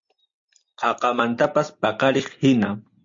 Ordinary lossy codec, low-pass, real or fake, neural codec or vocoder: MP3, 48 kbps; 7.2 kHz; real; none